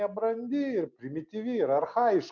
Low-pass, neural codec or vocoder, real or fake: 7.2 kHz; none; real